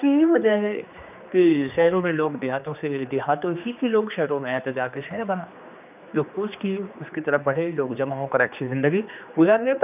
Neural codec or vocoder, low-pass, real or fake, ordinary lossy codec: codec, 16 kHz, 2 kbps, X-Codec, HuBERT features, trained on general audio; 3.6 kHz; fake; none